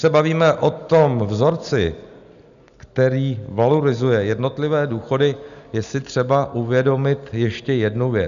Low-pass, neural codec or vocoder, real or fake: 7.2 kHz; none; real